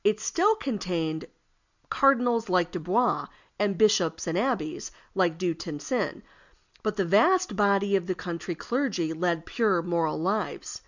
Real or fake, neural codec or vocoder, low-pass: real; none; 7.2 kHz